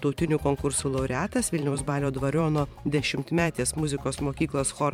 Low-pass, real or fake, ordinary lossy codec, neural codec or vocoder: 19.8 kHz; fake; MP3, 96 kbps; vocoder, 44.1 kHz, 128 mel bands every 512 samples, BigVGAN v2